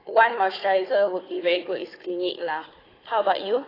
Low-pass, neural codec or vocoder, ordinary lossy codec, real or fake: 5.4 kHz; codec, 16 kHz, 4 kbps, FunCodec, trained on Chinese and English, 50 frames a second; AAC, 24 kbps; fake